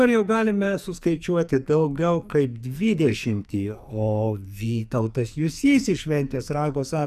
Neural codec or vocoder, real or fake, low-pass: codec, 44.1 kHz, 2.6 kbps, SNAC; fake; 14.4 kHz